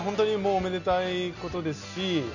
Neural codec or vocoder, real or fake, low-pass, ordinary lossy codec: none; real; 7.2 kHz; none